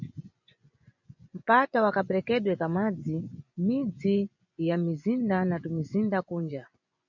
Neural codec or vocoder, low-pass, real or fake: vocoder, 24 kHz, 100 mel bands, Vocos; 7.2 kHz; fake